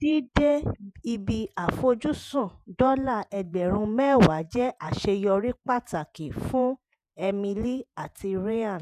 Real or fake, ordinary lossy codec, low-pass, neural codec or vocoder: fake; none; 14.4 kHz; vocoder, 48 kHz, 128 mel bands, Vocos